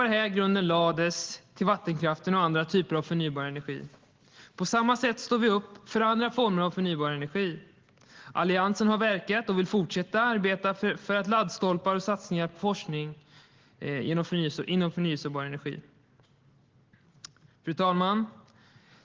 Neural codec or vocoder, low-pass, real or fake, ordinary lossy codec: none; 7.2 kHz; real; Opus, 16 kbps